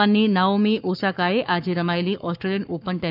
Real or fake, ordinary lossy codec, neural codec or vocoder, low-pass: fake; none; codec, 44.1 kHz, 7.8 kbps, Pupu-Codec; 5.4 kHz